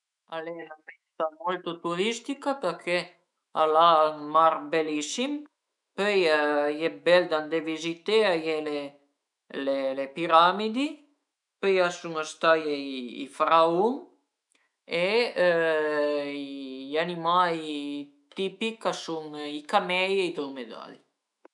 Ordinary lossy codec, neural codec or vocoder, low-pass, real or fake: none; autoencoder, 48 kHz, 128 numbers a frame, DAC-VAE, trained on Japanese speech; 10.8 kHz; fake